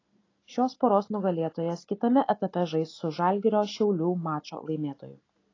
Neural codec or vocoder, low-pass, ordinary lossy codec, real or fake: none; 7.2 kHz; AAC, 32 kbps; real